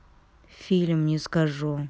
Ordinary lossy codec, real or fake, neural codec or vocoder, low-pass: none; real; none; none